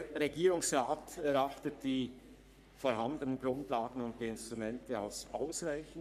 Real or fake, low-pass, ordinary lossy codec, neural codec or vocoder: fake; 14.4 kHz; none; codec, 44.1 kHz, 3.4 kbps, Pupu-Codec